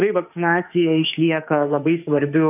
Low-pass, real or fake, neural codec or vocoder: 3.6 kHz; fake; autoencoder, 48 kHz, 32 numbers a frame, DAC-VAE, trained on Japanese speech